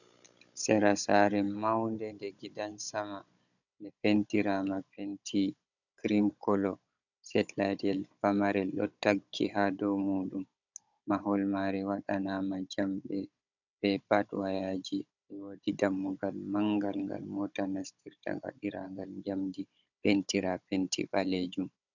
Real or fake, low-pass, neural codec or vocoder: fake; 7.2 kHz; codec, 16 kHz, 16 kbps, FunCodec, trained on Chinese and English, 50 frames a second